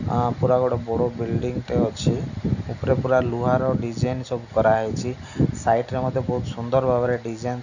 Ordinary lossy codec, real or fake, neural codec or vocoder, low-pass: none; real; none; 7.2 kHz